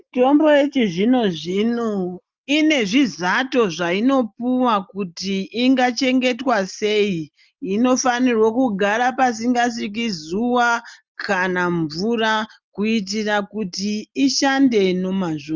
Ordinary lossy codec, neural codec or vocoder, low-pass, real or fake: Opus, 32 kbps; none; 7.2 kHz; real